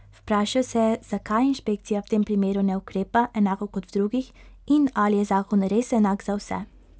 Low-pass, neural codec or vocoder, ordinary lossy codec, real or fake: none; none; none; real